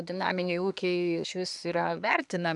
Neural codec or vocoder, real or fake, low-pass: codec, 24 kHz, 1 kbps, SNAC; fake; 10.8 kHz